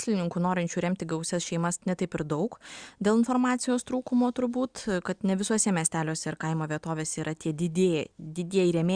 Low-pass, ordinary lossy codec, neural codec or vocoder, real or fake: 9.9 kHz; Opus, 64 kbps; none; real